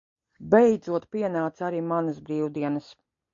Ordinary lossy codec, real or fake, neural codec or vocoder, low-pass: MP3, 48 kbps; real; none; 7.2 kHz